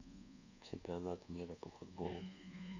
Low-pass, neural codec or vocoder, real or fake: 7.2 kHz; codec, 24 kHz, 1.2 kbps, DualCodec; fake